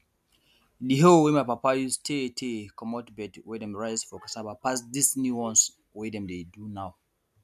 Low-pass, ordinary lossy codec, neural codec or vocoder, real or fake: 14.4 kHz; none; none; real